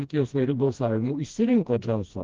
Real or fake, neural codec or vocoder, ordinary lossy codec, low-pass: fake; codec, 16 kHz, 1 kbps, FreqCodec, smaller model; Opus, 32 kbps; 7.2 kHz